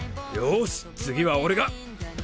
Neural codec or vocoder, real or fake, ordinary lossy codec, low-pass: none; real; none; none